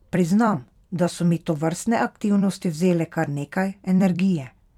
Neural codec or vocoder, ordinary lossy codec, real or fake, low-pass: vocoder, 44.1 kHz, 128 mel bands every 256 samples, BigVGAN v2; none; fake; 19.8 kHz